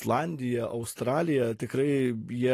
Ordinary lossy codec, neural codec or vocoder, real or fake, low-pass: AAC, 48 kbps; none; real; 14.4 kHz